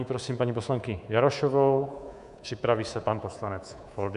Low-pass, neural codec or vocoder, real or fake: 10.8 kHz; codec, 24 kHz, 3.1 kbps, DualCodec; fake